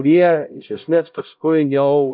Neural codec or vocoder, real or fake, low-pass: codec, 16 kHz, 0.5 kbps, X-Codec, HuBERT features, trained on LibriSpeech; fake; 5.4 kHz